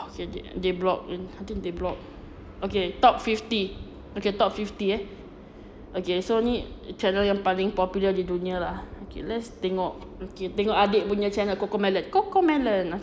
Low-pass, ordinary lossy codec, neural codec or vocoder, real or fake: none; none; none; real